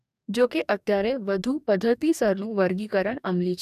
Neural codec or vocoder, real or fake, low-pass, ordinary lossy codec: codec, 44.1 kHz, 2.6 kbps, DAC; fake; 14.4 kHz; none